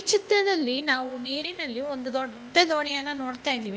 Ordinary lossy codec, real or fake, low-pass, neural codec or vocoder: none; fake; none; codec, 16 kHz, 0.8 kbps, ZipCodec